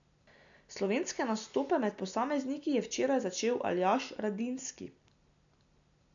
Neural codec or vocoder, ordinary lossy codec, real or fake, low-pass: none; none; real; 7.2 kHz